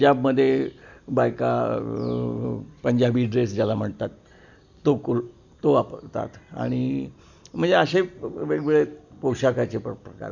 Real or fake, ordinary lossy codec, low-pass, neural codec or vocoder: real; none; 7.2 kHz; none